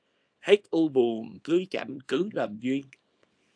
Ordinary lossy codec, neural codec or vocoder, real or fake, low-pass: AAC, 64 kbps; codec, 24 kHz, 0.9 kbps, WavTokenizer, small release; fake; 9.9 kHz